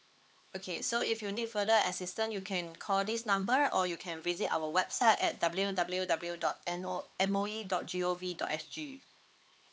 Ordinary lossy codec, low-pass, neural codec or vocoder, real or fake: none; none; codec, 16 kHz, 4 kbps, X-Codec, HuBERT features, trained on LibriSpeech; fake